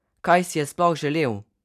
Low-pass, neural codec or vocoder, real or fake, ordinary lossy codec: 14.4 kHz; none; real; none